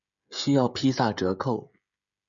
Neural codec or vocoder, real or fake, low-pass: codec, 16 kHz, 16 kbps, FreqCodec, smaller model; fake; 7.2 kHz